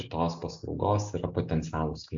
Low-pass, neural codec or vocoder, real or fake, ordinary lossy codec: 7.2 kHz; none; real; AAC, 64 kbps